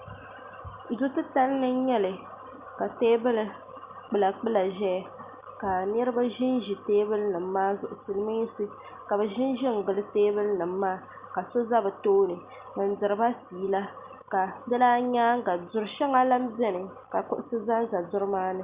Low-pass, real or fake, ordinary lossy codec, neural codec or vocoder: 3.6 kHz; real; Opus, 64 kbps; none